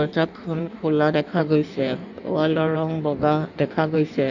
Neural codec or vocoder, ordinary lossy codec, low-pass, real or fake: codec, 16 kHz in and 24 kHz out, 1.1 kbps, FireRedTTS-2 codec; none; 7.2 kHz; fake